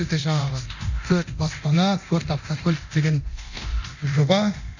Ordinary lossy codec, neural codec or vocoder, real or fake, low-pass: none; codec, 24 kHz, 0.9 kbps, DualCodec; fake; 7.2 kHz